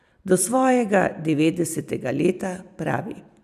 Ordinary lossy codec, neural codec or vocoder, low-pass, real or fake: none; vocoder, 44.1 kHz, 128 mel bands every 256 samples, BigVGAN v2; 14.4 kHz; fake